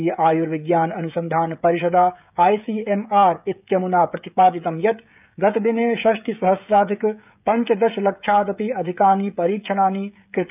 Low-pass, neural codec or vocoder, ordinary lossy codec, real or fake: 3.6 kHz; codec, 16 kHz, 16 kbps, FreqCodec, smaller model; none; fake